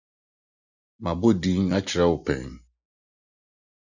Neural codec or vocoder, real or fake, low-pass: none; real; 7.2 kHz